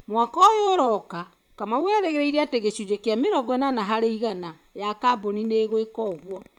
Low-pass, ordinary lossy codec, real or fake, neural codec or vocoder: 19.8 kHz; none; fake; vocoder, 44.1 kHz, 128 mel bands, Pupu-Vocoder